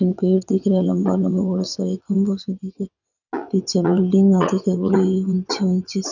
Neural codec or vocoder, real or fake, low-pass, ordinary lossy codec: vocoder, 22.05 kHz, 80 mel bands, WaveNeXt; fake; 7.2 kHz; none